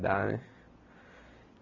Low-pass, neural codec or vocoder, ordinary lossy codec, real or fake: 7.2 kHz; none; none; real